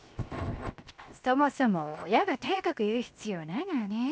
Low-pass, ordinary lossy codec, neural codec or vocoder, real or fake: none; none; codec, 16 kHz, 0.7 kbps, FocalCodec; fake